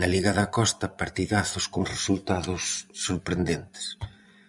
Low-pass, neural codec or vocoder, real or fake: 10.8 kHz; vocoder, 24 kHz, 100 mel bands, Vocos; fake